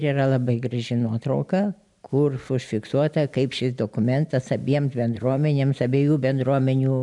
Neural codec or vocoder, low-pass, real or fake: none; 10.8 kHz; real